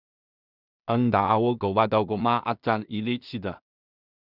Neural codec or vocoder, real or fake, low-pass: codec, 16 kHz in and 24 kHz out, 0.4 kbps, LongCat-Audio-Codec, two codebook decoder; fake; 5.4 kHz